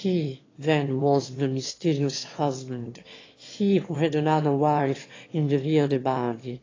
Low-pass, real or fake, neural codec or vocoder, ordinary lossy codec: 7.2 kHz; fake; autoencoder, 22.05 kHz, a latent of 192 numbers a frame, VITS, trained on one speaker; AAC, 32 kbps